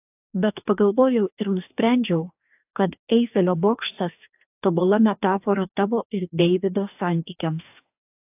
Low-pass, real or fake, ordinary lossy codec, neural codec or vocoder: 3.6 kHz; fake; AAC, 32 kbps; codec, 44.1 kHz, 2.6 kbps, DAC